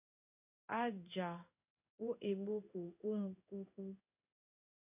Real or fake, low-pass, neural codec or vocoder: fake; 3.6 kHz; codec, 16 kHz in and 24 kHz out, 1 kbps, XY-Tokenizer